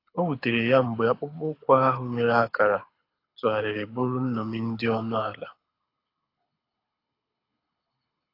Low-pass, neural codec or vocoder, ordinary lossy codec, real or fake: 5.4 kHz; codec, 24 kHz, 6 kbps, HILCodec; AAC, 32 kbps; fake